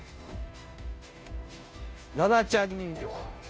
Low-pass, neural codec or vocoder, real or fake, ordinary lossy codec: none; codec, 16 kHz, 0.5 kbps, FunCodec, trained on Chinese and English, 25 frames a second; fake; none